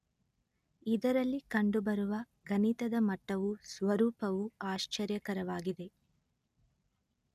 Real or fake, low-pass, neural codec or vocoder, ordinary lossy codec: real; 14.4 kHz; none; none